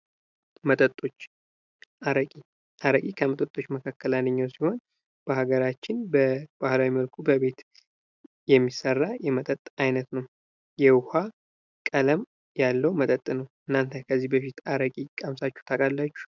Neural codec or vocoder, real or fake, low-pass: none; real; 7.2 kHz